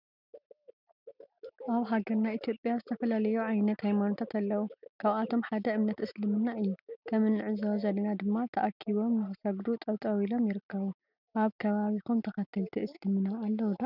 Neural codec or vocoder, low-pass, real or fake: none; 5.4 kHz; real